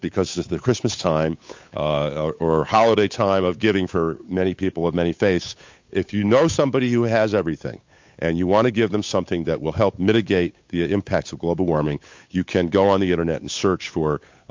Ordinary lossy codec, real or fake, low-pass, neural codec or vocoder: MP3, 48 kbps; fake; 7.2 kHz; codec, 16 kHz, 8 kbps, FunCodec, trained on Chinese and English, 25 frames a second